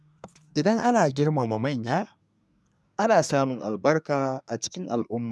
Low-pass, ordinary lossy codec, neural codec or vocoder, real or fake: none; none; codec, 24 kHz, 1 kbps, SNAC; fake